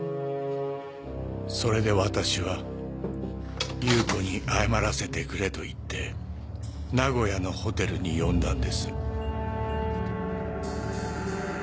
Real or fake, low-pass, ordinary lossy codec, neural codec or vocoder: real; none; none; none